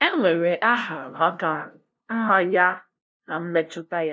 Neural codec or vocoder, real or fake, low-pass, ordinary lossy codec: codec, 16 kHz, 0.5 kbps, FunCodec, trained on LibriTTS, 25 frames a second; fake; none; none